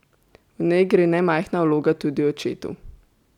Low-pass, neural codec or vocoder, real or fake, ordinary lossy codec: 19.8 kHz; none; real; none